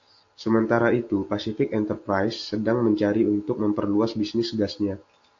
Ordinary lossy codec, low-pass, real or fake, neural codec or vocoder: AAC, 48 kbps; 7.2 kHz; real; none